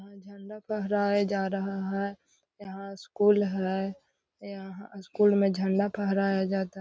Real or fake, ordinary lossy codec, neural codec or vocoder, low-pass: real; none; none; none